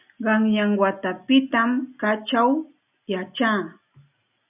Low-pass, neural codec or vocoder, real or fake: 3.6 kHz; none; real